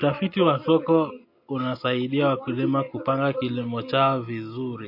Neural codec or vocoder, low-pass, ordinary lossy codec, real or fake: none; 5.4 kHz; MP3, 32 kbps; real